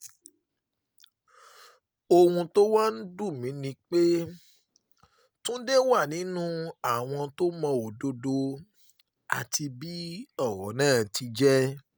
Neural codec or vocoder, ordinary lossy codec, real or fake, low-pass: none; none; real; none